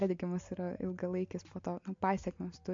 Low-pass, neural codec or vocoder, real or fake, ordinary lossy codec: 7.2 kHz; none; real; MP3, 48 kbps